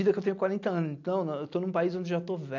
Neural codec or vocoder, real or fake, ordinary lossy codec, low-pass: none; real; AAC, 48 kbps; 7.2 kHz